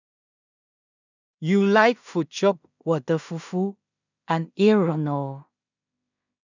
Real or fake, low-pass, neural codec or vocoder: fake; 7.2 kHz; codec, 16 kHz in and 24 kHz out, 0.4 kbps, LongCat-Audio-Codec, two codebook decoder